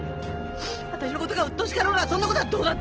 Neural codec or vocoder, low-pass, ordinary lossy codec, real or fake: none; 7.2 kHz; Opus, 16 kbps; real